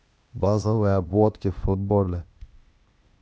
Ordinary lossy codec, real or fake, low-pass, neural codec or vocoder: none; fake; none; codec, 16 kHz, 0.8 kbps, ZipCodec